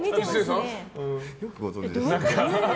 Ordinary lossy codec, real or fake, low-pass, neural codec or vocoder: none; real; none; none